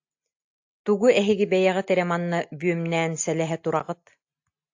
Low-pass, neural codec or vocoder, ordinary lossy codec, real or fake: 7.2 kHz; none; AAC, 48 kbps; real